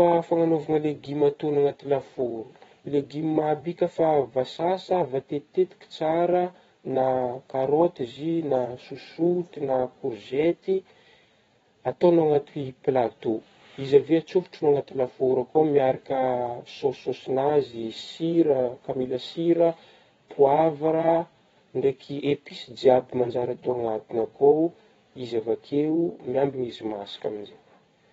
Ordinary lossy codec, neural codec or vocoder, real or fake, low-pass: AAC, 24 kbps; vocoder, 22.05 kHz, 80 mel bands, WaveNeXt; fake; 9.9 kHz